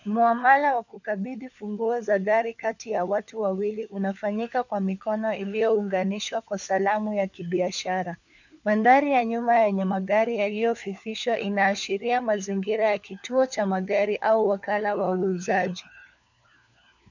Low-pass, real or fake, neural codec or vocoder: 7.2 kHz; fake; codec, 16 kHz, 4 kbps, FunCodec, trained on LibriTTS, 50 frames a second